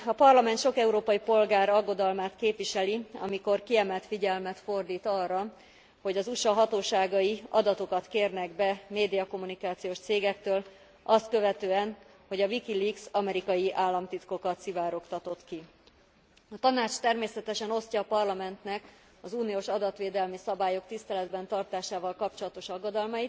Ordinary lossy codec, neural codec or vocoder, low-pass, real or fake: none; none; none; real